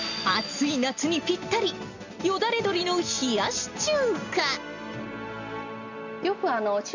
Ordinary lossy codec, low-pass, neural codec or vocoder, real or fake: none; 7.2 kHz; none; real